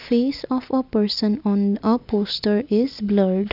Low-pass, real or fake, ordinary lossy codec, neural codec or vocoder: 5.4 kHz; real; none; none